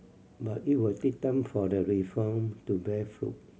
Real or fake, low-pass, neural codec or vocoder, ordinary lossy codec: real; none; none; none